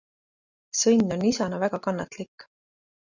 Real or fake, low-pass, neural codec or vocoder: real; 7.2 kHz; none